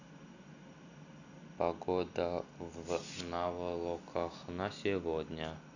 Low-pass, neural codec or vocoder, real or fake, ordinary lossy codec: 7.2 kHz; none; real; none